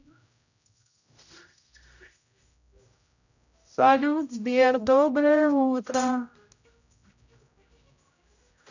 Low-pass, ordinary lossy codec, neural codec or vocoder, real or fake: 7.2 kHz; none; codec, 16 kHz, 0.5 kbps, X-Codec, HuBERT features, trained on general audio; fake